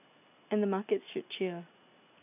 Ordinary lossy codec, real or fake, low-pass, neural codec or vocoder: none; real; 3.6 kHz; none